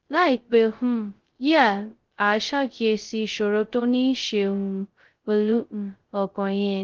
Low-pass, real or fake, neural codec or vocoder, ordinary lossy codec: 7.2 kHz; fake; codec, 16 kHz, 0.2 kbps, FocalCodec; Opus, 16 kbps